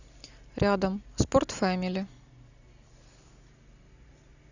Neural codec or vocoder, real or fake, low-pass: none; real; 7.2 kHz